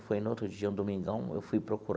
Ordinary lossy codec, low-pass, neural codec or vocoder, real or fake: none; none; none; real